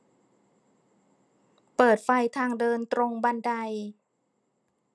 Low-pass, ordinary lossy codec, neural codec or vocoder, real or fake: none; none; none; real